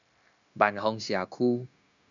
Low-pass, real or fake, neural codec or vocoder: 7.2 kHz; fake; codec, 16 kHz, 0.9 kbps, LongCat-Audio-Codec